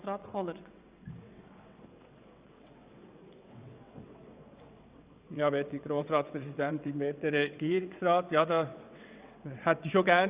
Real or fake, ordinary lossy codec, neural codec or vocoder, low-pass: fake; Opus, 64 kbps; vocoder, 22.05 kHz, 80 mel bands, WaveNeXt; 3.6 kHz